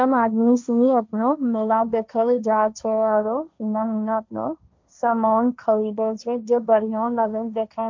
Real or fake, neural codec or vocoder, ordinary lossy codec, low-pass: fake; codec, 16 kHz, 1.1 kbps, Voila-Tokenizer; MP3, 64 kbps; 7.2 kHz